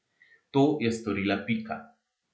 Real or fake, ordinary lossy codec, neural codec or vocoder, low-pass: real; none; none; none